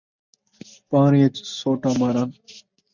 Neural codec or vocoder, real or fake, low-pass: none; real; 7.2 kHz